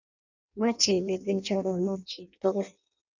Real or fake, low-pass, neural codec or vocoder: fake; 7.2 kHz; codec, 16 kHz in and 24 kHz out, 0.6 kbps, FireRedTTS-2 codec